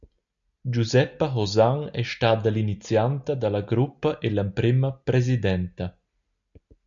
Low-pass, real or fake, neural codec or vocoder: 7.2 kHz; real; none